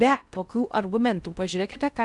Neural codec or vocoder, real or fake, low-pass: codec, 16 kHz in and 24 kHz out, 0.6 kbps, FocalCodec, streaming, 4096 codes; fake; 10.8 kHz